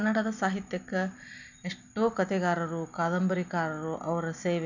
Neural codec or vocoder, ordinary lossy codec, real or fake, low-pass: none; none; real; 7.2 kHz